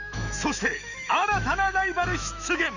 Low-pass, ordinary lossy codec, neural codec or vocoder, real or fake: 7.2 kHz; none; autoencoder, 48 kHz, 128 numbers a frame, DAC-VAE, trained on Japanese speech; fake